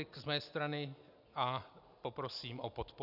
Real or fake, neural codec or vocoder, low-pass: real; none; 5.4 kHz